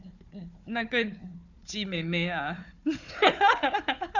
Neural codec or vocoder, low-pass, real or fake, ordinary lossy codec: codec, 16 kHz, 4 kbps, FunCodec, trained on Chinese and English, 50 frames a second; 7.2 kHz; fake; none